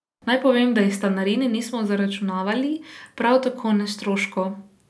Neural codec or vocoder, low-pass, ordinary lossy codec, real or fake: none; none; none; real